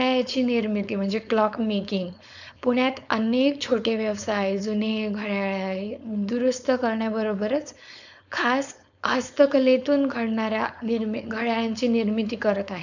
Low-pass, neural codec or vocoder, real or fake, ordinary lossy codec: 7.2 kHz; codec, 16 kHz, 4.8 kbps, FACodec; fake; none